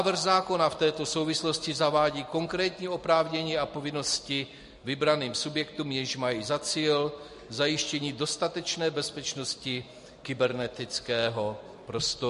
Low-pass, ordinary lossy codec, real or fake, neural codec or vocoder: 14.4 kHz; MP3, 48 kbps; real; none